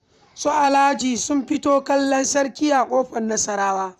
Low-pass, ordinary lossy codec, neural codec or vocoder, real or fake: 14.4 kHz; MP3, 96 kbps; vocoder, 44.1 kHz, 128 mel bands, Pupu-Vocoder; fake